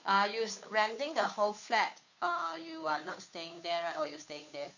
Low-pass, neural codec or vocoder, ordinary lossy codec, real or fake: 7.2 kHz; codec, 16 kHz, 2 kbps, FunCodec, trained on Chinese and English, 25 frames a second; none; fake